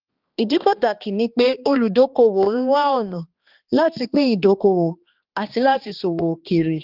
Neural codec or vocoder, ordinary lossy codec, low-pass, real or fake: codec, 16 kHz, 2 kbps, X-Codec, HuBERT features, trained on balanced general audio; Opus, 32 kbps; 5.4 kHz; fake